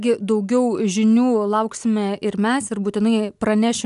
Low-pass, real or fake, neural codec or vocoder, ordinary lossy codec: 10.8 kHz; real; none; MP3, 96 kbps